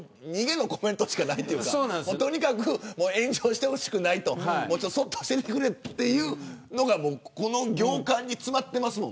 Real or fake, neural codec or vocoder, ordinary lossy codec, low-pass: real; none; none; none